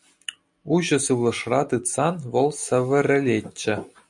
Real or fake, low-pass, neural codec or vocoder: real; 10.8 kHz; none